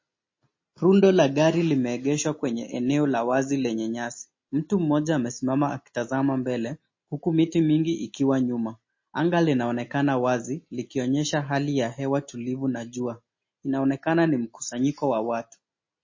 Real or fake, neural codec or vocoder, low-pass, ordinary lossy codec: real; none; 7.2 kHz; MP3, 32 kbps